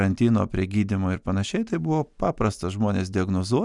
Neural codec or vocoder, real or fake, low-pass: none; real; 10.8 kHz